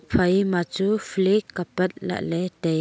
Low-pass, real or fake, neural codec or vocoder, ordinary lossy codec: none; real; none; none